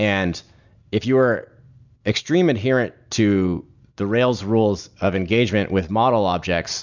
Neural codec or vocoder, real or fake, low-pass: none; real; 7.2 kHz